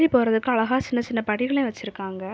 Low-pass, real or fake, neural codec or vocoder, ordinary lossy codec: none; real; none; none